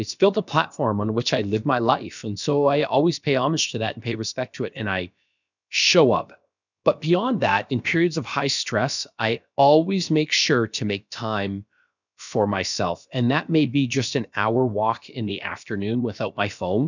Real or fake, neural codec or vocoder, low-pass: fake; codec, 16 kHz, about 1 kbps, DyCAST, with the encoder's durations; 7.2 kHz